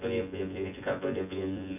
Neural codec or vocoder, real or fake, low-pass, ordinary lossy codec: vocoder, 24 kHz, 100 mel bands, Vocos; fake; 3.6 kHz; none